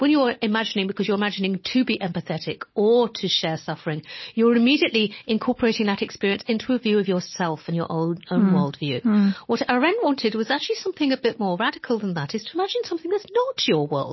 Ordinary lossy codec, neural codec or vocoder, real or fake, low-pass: MP3, 24 kbps; none; real; 7.2 kHz